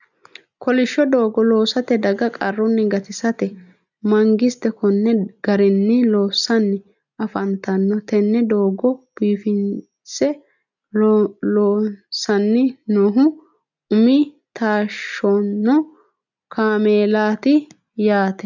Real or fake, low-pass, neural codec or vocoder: real; 7.2 kHz; none